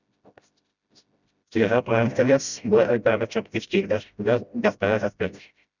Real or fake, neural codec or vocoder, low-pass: fake; codec, 16 kHz, 0.5 kbps, FreqCodec, smaller model; 7.2 kHz